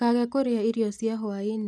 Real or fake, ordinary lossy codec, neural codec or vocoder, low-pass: real; none; none; none